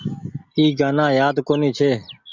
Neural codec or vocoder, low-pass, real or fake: none; 7.2 kHz; real